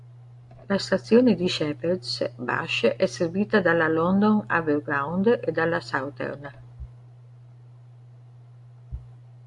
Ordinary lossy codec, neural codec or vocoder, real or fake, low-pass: AAC, 64 kbps; none; real; 10.8 kHz